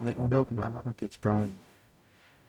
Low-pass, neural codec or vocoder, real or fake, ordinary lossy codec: 19.8 kHz; codec, 44.1 kHz, 0.9 kbps, DAC; fake; none